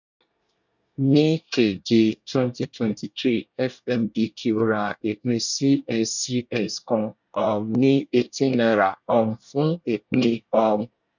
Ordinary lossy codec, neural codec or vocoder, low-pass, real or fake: none; codec, 24 kHz, 1 kbps, SNAC; 7.2 kHz; fake